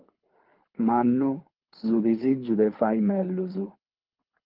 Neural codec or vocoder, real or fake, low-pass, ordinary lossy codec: codec, 24 kHz, 6 kbps, HILCodec; fake; 5.4 kHz; Opus, 32 kbps